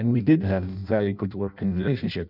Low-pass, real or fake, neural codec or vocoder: 5.4 kHz; fake; codec, 16 kHz in and 24 kHz out, 0.6 kbps, FireRedTTS-2 codec